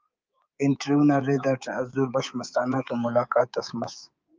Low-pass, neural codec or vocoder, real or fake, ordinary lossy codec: 7.2 kHz; codec, 24 kHz, 3.1 kbps, DualCodec; fake; Opus, 24 kbps